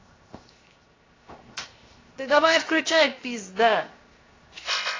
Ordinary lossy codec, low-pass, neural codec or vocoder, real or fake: AAC, 32 kbps; 7.2 kHz; codec, 16 kHz, 0.7 kbps, FocalCodec; fake